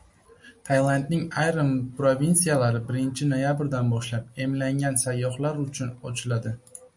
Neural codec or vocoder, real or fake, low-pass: none; real; 10.8 kHz